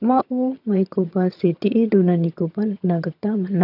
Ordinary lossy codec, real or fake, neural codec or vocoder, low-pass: none; fake; vocoder, 22.05 kHz, 80 mel bands, HiFi-GAN; 5.4 kHz